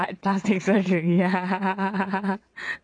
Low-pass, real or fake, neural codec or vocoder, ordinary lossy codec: 9.9 kHz; fake; vocoder, 22.05 kHz, 80 mel bands, WaveNeXt; none